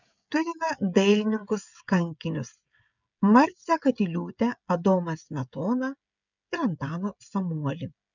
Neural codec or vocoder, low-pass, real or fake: codec, 16 kHz, 16 kbps, FreqCodec, smaller model; 7.2 kHz; fake